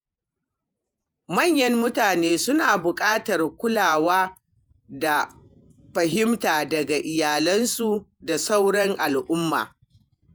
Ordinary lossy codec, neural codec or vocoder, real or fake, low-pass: none; vocoder, 48 kHz, 128 mel bands, Vocos; fake; none